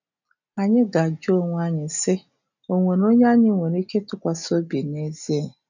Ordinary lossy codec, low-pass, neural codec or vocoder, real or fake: none; 7.2 kHz; none; real